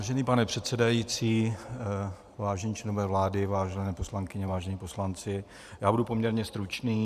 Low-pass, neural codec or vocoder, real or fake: 14.4 kHz; vocoder, 44.1 kHz, 128 mel bands every 512 samples, BigVGAN v2; fake